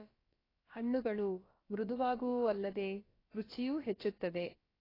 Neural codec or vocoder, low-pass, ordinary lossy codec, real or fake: codec, 16 kHz, about 1 kbps, DyCAST, with the encoder's durations; 5.4 kHz; AAC, 24 kbps; fake